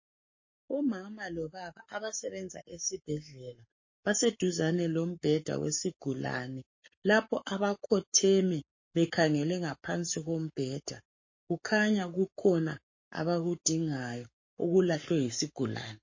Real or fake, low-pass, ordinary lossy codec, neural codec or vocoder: fake; 7.2 kHz; MP3, 32 kbps; codec, 44.1 kHz, 7.8 kbps, Pupu-Codec